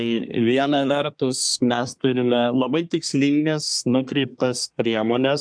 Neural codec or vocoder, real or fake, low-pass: codec, 24 kHz, 1 kbps, SNAC; fake; 9.9 kHz